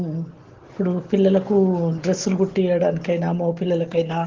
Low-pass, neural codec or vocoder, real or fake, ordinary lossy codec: 7.2 kHz; none; real; Opus, 16 kbps